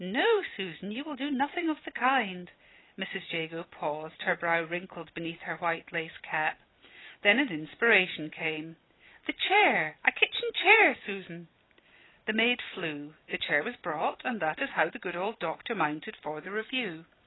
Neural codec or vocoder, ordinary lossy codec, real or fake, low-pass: vocoder, 44.1 kHz, 128 mel bands every 256 samples, BigVGAN v2; AAC, 16 kbps; fake; 7.2 kHz